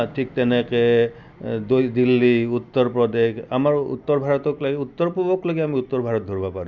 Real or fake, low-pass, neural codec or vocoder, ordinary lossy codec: real; 7.2 kHz; none; none